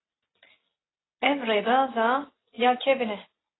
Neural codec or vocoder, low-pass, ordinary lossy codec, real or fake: none; 7.2 kHz; AAC, 16 kbps; real